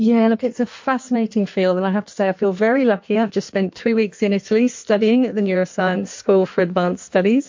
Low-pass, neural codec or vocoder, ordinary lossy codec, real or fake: 7.2 kHz; codec, 16 kHz in and 24 kHz out, 1.1 kbps, FireRedTTS-2 codec; MP3, 48 kbps; fake